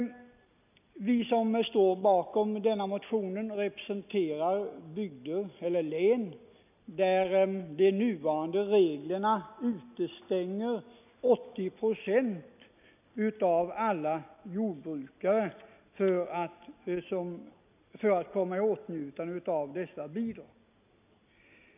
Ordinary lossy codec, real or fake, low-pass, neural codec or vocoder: none; real; 3.6 kHz; none